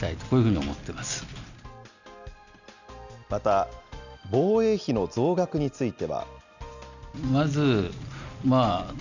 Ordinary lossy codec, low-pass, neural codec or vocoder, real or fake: none; 7.2 kHz; none; real